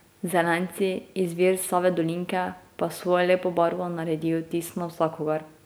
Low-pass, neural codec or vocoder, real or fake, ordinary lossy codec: none; none; real; none